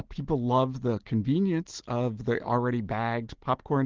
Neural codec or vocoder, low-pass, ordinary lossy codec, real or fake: none; 7.2 kHz; Opus, 16 kbps; real